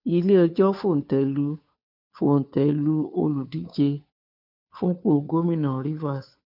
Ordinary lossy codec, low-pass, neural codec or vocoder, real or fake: none; 5.4 kHz; codec, 16 kHz, 2 kbps, FunCodec, trained on Chinese and English, 25 frames a second; fake